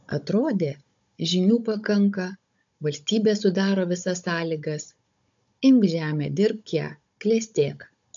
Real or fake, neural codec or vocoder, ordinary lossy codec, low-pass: fake; codec, 16 kHz, 16 kbps, FunCodec, trained on Chinese and English, 50 frames a second; AAC, 64 kbps; 7.2 kHz